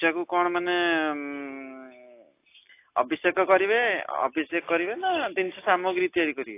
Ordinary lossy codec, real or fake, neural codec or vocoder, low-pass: AAC, 24 kbps; real; none; 3.6 kHz